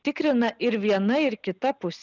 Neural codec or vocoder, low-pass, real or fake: none; 7.2 kHz; real